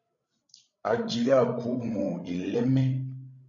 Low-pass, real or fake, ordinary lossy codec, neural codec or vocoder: 7.2 kHz; fake; MP3, 96 kbps; codec, 16 kHz, 16 kbps, FreqCodec, larger model